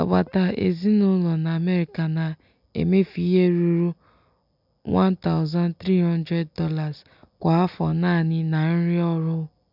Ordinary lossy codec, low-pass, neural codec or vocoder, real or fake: none; 5.4 kHz; none; real